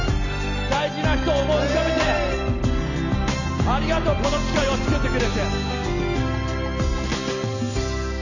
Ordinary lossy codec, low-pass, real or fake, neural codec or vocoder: none; 7.2 kHz; real; none